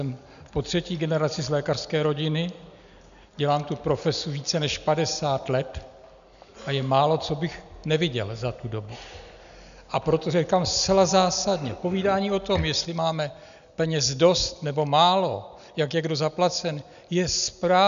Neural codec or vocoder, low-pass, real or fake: none; 7.2 kHz; real